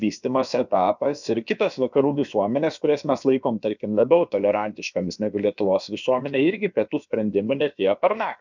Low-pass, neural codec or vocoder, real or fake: 7.2 kHz; codec, 16 kHz, about 1 kbps, DyCAST, with the encoder's durations; fake